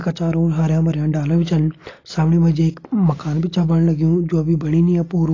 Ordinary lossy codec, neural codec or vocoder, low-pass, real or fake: AAC, 32 kbps; none; 7.2 kHz; real